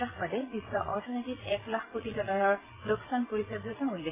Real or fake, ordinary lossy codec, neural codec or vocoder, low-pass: fake; AAC, 16 kbps; vocoder, 22.05 kHz, 80 mel bands, Vocos; 3.6 kHz